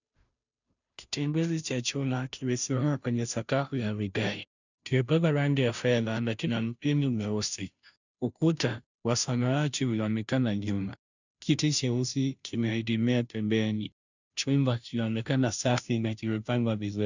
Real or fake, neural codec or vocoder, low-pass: fake; codec, 16 kHz, 0.5 kbps, FunCodec, trained on Chinese and English, 25 frames a second; 7.2 kHz